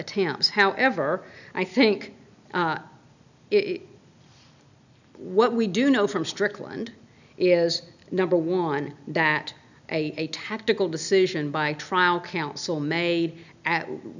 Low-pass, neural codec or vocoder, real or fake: 7.2 kHz; none; real